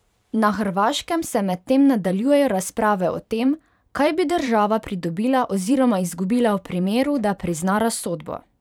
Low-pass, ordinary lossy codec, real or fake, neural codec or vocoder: 19.8 kHz; none; fake; vocoder, 44.1 kHz, 128 mel bands every 512 samples, BigVGAN v2